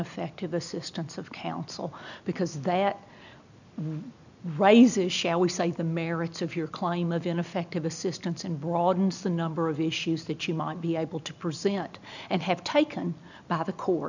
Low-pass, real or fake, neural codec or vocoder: 7.2 kHz; real; none